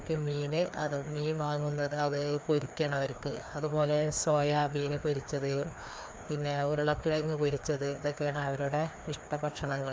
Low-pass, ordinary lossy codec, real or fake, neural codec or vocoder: none; none; fake; codec, 16 kHz, 2 kbps, FreqCodec, larger model